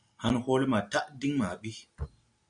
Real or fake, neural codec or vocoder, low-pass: real; none; 9.9 kHz